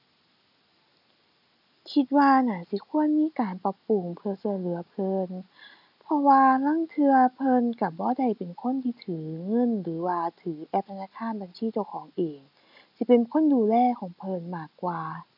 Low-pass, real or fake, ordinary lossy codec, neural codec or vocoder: 5.4 kHz; real; none; none